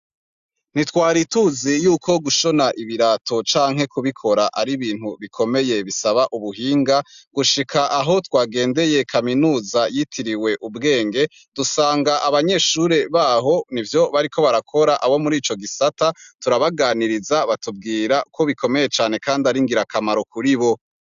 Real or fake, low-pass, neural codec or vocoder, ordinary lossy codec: real; 7.2 kHz; none; AAC, 96 kbps